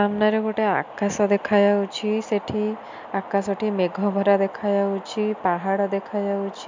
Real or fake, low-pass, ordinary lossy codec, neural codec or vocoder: real; 7.2 kHz; MP3, 64 kbps; none